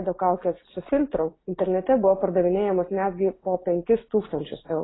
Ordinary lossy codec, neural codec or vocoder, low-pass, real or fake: AAC, 16 kbps; none; 7.2 kHz; real